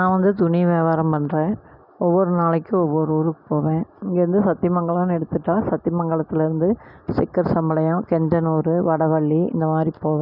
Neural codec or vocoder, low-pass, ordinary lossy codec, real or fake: none; 5.4 kHz; none; real